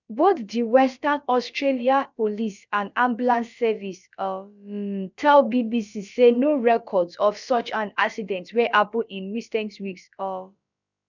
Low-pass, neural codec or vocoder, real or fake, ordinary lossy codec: 7.2 kHz; codec, 16 kHz, about 1 kbps, DyCAST, with the encoder's durations; fake; none